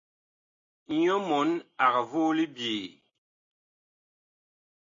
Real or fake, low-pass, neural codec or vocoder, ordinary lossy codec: real; 7.2 kHz; none; AAC, 48 kbps